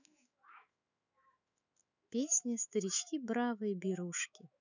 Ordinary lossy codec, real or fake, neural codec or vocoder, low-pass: none; fake; autoencoder, 48 kHz, 128 numbers a frame, DAC-VAE, trained on Japanese speech; 7.2 kHz